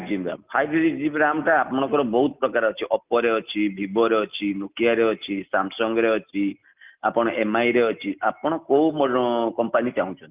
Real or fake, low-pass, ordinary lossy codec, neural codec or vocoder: real; 3.6 kHz; Opus, 24 kbps; none